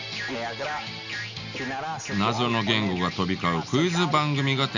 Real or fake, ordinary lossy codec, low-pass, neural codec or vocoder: real; none; 7.2 kHz; none